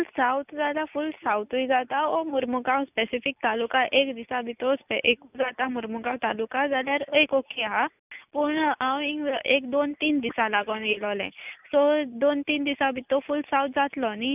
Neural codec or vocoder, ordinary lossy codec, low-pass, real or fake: none; none; 3.6 kHz; real